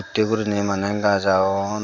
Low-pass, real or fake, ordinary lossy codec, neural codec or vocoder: 7.2 kHz; real; none; none